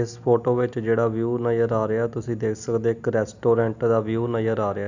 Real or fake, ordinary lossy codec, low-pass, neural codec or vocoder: real; none; 7.2 kHz; none